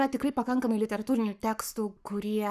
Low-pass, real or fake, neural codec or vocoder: 14.4 kHz; fake; codec, 44.1 kHz, 7.8 kbps, Pupu-Codec